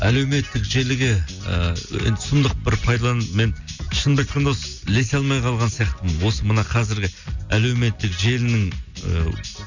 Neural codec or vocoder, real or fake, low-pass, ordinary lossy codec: none; real; 7.2 kHz; none